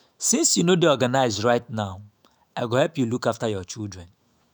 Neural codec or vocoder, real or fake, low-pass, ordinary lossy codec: autoencoder, 48 kHz, 128 numbers a frame, DAC-VAE, trained on Japanese speech; fake; none; none